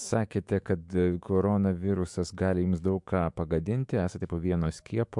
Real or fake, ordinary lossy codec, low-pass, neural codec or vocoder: fake; MP3, 64 kbps; 10.8 kHz; autoencoder, 48 kHz, 128 numbers a frame, DAC-VAE, trained on Japanese speech